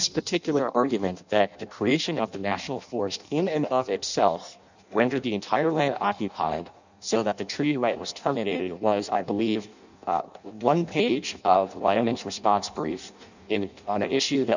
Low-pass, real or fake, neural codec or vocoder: 7.2 kHz; fake; codec, 16 kHz in and 24 kHz out, 0.6 kbps, FireRedTTS-2 codec